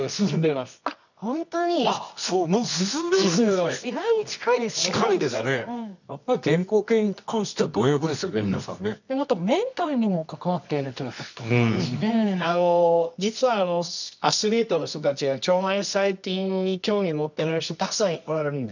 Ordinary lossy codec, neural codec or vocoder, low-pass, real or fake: none; codec, 24 kHz, 0.9 kbps, WavTokenizer, medium music audio release; 7.2 kHz; fake